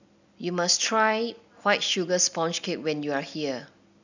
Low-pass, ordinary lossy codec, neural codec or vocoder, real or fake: 7.2 kHz; none; none; real